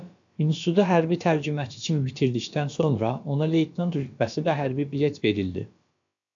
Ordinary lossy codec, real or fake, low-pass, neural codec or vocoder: AAC, 64 kbps; fake; 7.2 kHz; codec, 16 kHz, about 1 kbps, DyCAST, with the encoder's durations